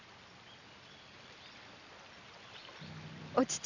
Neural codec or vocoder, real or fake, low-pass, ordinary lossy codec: none; real; 7.2 kHz; none